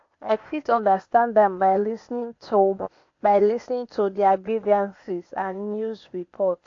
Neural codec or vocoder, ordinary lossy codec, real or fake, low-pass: codec, 16 kHz, 0.8 kbps, ZipCodec; AAC, 48 kbps; fake; 7.2 kHz